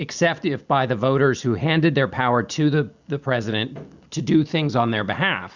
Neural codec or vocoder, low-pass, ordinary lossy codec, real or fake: none; 7.2 kHz; Opus, 64 kbps; real